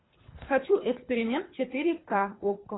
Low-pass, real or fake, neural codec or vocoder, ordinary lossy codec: 7.2 kHz; fake; codec, 24 kHz, 3 kbps, HILCodec; AAC, 16 kbps